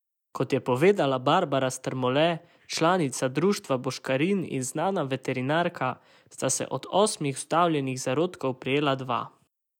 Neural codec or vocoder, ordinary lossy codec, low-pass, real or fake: none; none; 19.8 kHz; real